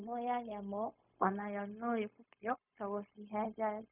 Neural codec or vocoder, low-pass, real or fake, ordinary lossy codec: codec, 16 kHz, 0.4 kbps, LongCat-Audio-Codec; 3.6 kHz; fake; none